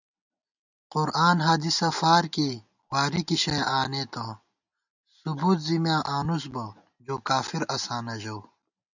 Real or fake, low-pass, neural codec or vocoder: real; 7.2 kHz; none